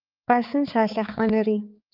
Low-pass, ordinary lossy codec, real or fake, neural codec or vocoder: 5.4 kHz; Opus, 24 kbps; fake; codec, 16 kHz, 4 kbps, X-Codec, HuBERT features, trained on balanced general audio